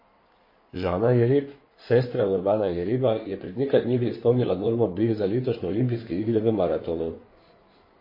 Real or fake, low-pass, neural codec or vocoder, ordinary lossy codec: fake; 5.4 kHz; codec, 16 kHz in and 24 kHz out, 2.2 kbps, FireRedTTS-2 codec; MP3, 24 kbps